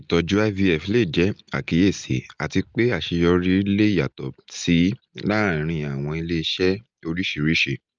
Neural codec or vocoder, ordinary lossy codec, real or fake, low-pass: none; Opus, 24 kbps; real; 7.2 kHz